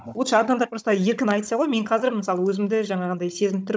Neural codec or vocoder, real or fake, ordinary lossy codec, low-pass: codec, 16 kHz, 16 kbps, FunCodec, trained on Chinese and English, 50 frames a second; fake; none; none